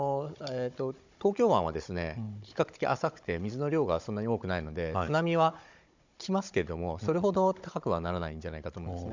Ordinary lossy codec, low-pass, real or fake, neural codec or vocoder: MP3, 64 kbps; 7.2 kHz; fake; codec, 16 kHz, 16 kbps, FunCodec, trained on Chinese and English, 50 frames a second